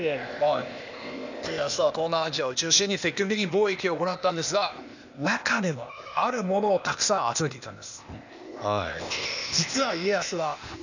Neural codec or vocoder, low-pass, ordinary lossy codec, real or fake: codec, 16 kHz, 0.8 kbps, ZipCodec; 7.2 kHz; none; fake